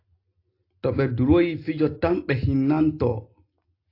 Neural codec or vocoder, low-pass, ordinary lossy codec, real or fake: none; 5.4 kHz; AAC, 32 kbps; real